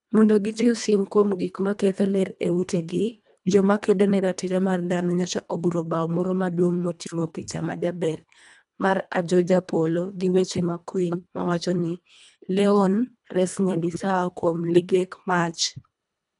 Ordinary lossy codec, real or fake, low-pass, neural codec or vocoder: none; fake; 10.8 kHz; codec, 24 kHz, 1.5 kbps, HILCodec